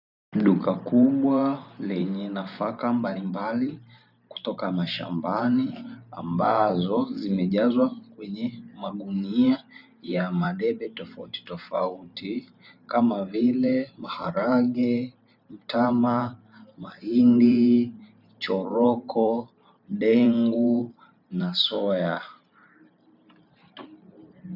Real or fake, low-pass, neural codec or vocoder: fake; 5.4 kHz; vocoder, 44.1 kHz, 128 mel bands every 512 samples, BigVGAN v2